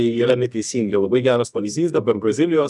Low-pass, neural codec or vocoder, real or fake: 10.8 kHz; codec, 24 kHz, 0.9 kbps, WavTokenizer, medium music audio release; fake